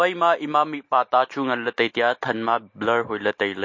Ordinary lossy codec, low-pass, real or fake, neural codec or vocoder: MP3, 32 kbps; 7.2 kHz; real; none